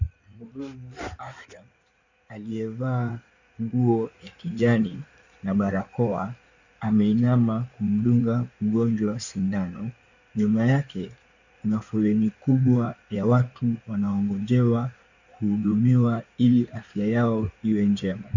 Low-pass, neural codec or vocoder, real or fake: 7.2 kHz; codec, 16 kHz in and 24 kHz out, 2.2 kbps, FireRedTTS-2 codec; fake